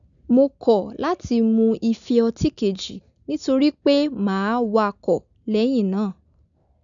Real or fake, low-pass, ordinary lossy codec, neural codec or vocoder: real; 7.2 kHz; none; none